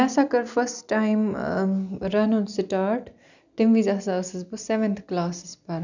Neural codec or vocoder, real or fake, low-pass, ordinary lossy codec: none; real; 7.2 kHz; none